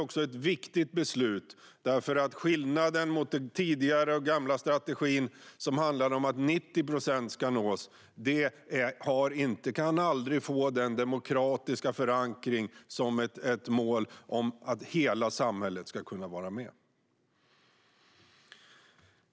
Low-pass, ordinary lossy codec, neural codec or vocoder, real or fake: none; none; none; real